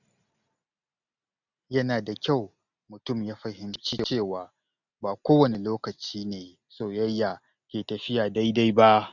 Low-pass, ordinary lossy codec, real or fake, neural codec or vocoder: 7.2 kHz; none; real; none